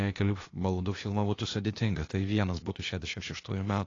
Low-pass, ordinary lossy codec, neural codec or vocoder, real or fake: 7.2 kHz; AAC, 32 kbps; codec, 16 kHz, 0.8 kbps, ZipCodec; fake